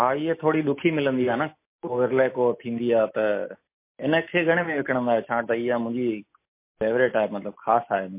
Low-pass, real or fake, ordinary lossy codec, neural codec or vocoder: 3.6 kHz; real; MP3, 24 kbps; none